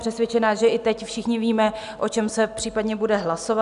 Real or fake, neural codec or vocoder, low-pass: real; none; 10.8 kHz